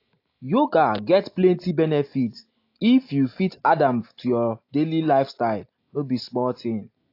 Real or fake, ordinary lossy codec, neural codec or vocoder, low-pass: real; AAC, 32 kbps; none; 5.4 kHz